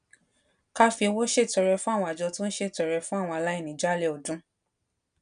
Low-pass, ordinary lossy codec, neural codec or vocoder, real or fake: 9.9 kHz; none; none; real